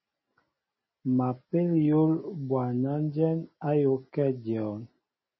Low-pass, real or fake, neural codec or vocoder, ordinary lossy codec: 7.2 kHz; real; none; MP3, 24 kbps